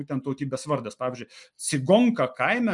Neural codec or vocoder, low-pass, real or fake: none; 10.8 kHz; real